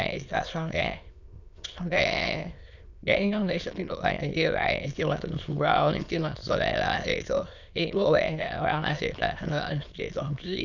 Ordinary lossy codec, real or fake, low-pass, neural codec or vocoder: none; fake; 7.2 kHz; autoencoder, 22.05 kHz, a latent of 192 numbers a frame, VITS, trained on many speakers